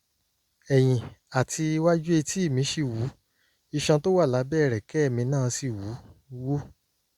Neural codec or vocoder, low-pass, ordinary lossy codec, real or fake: none; 19.8 kHz; Opus, 64 kbps; real